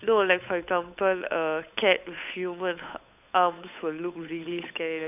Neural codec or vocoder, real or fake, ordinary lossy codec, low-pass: codec, 16 kHz, 8 kbps, FunCodec, trained on Chinese and English, 25 frames a second; fake; none; 3.6 kHz